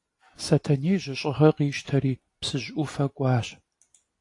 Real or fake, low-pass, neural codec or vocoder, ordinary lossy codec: real; 10.8 kHz; none; AAC, 48 kbps